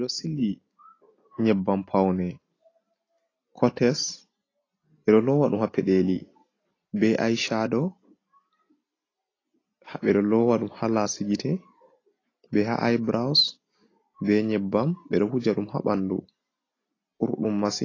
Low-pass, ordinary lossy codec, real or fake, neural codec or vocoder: 7.2 kHz; AAC, 32 kbps; real; none